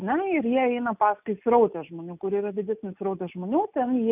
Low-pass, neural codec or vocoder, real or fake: 3.6 kHz; none; real